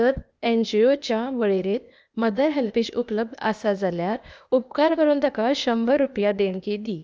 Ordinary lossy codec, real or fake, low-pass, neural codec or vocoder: none; fake; none; codec, 16 kHz, 0.8 kbps, ZipCodec